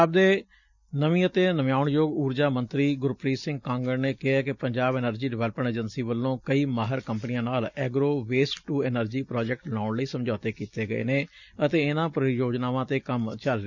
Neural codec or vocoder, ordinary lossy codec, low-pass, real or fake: none; none; 7.2 kHz; real